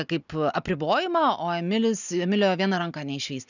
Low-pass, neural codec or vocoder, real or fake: 7.2 kHz; none; real